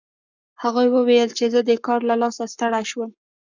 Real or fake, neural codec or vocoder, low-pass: fake; codec, 44.1 kHz, 7.8 kbps, Pupu-Codec; 7.2 kHz